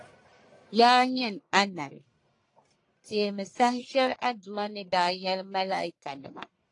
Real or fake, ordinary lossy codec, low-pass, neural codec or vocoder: fake; AAC, 48 kbps; 10.8 kHz; codec, 44.1 kHz, 1.7 kbps, Pupu-Codec